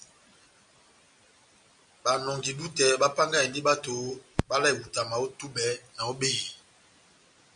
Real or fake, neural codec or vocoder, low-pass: real; none; 9.9 kHz